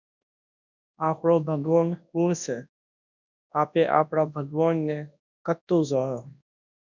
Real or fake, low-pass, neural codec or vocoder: fake; 7.2 kHz; codec, 24 kHz, 0.9 kbps, WavTokenizer, large speech release